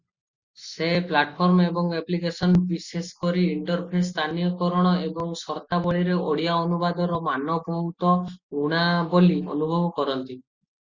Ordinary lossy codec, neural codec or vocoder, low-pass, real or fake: Opus, 64 kbps; none; 7.2 kHz; real